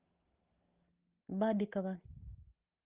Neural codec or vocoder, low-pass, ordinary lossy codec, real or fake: none; 3.6 kHz; Opus, 24 kbps; real